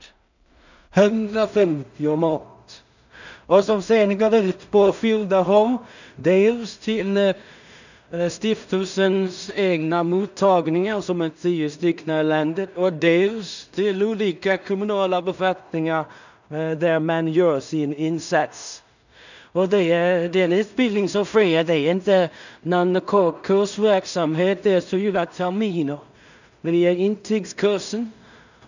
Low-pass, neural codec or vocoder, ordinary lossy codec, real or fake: 7.2 kHz; codec, 16 kHz in and 24 kHz out, 0.4 kbps, LongCat-Audio-Codec, two codebook decoder; none; fake